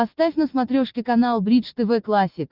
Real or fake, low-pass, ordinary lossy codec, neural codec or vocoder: real; 5.4 kHz; Opus, 24 kbps; none